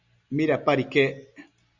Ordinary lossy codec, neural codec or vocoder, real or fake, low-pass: Opus, 64 kbps; none; real; 7.2 kHz